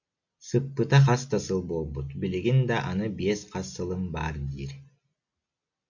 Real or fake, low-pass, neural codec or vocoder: real; 7.2 kHz; none